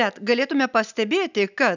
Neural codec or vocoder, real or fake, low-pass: none; real; 7.2 kHz